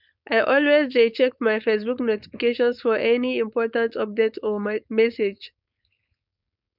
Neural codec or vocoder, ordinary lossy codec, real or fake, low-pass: codec, 16 kHz, 4.8 kbps, FACodec; none; fake; 5.4 kHz